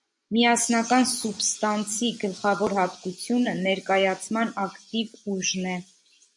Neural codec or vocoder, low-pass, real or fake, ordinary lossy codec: vocoder, 24 kHz, 100 mel bands, Vocos; 10.8 kHz; fake; MP3, 64 kbps